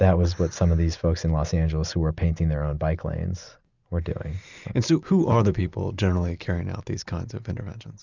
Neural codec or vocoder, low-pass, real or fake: none; 7.2 kHz; real